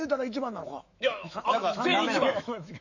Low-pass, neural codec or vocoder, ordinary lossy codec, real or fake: 7.2 kHz; autoencoder, 48 kHz, 128 numbers a frame, DAC-VAE, trained on Japanese speech; none; fake